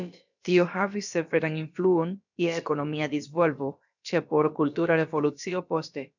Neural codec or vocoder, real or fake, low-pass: codec, 16 kHz, about 1 kbps, DyCAST, with the encoder's durations; fake; 7.2 kHz